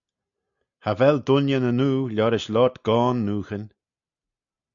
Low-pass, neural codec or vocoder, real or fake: 7.2 kHz; none; real